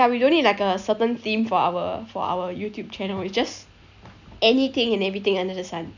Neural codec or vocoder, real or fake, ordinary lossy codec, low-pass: none; real; none; 7.2 kHz